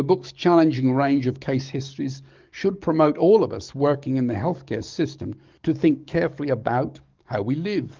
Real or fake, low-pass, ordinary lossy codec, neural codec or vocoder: fake; 7.2 kHz; Opus, 32 kbps; codec, 44.1 kHz, 7.8 kbps, DAC